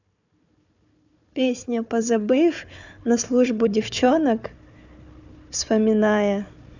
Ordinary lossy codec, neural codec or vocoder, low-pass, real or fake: none; codec, 16 kHz, 4 kbps, FunCodec, trained on Chinese and English, 50 frames a second; 7.2 kHz; fake